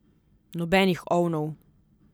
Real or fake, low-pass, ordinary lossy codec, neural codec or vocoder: real; none; none; none